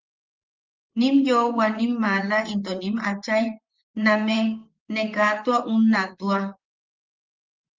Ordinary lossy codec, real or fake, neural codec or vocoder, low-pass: Opus, 32 kbps; fake; vocoder, 44.1 kHz, 128 mel bands, Pupu-Vocoder; 7.2 kHz